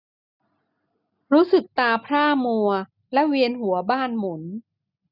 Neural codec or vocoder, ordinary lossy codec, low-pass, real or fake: none; none; 5.4 kHz; real